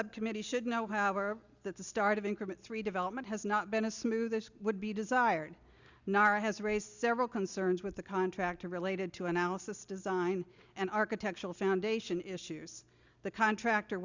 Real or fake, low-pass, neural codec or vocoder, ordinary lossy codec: real; 7.2 kHz; none; Opus, 64 kbps